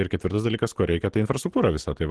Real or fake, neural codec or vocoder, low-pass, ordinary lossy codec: real; none; 10.8 kHz; Opus, 16 kbps